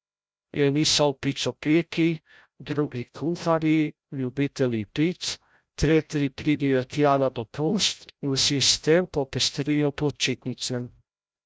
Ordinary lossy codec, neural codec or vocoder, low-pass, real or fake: none; codec, 16 kHz, 0.5 kbps, FreqCodec, larger model; none; fake